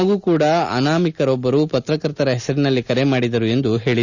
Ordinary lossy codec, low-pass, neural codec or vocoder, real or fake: none; 7.2 kHz; none; real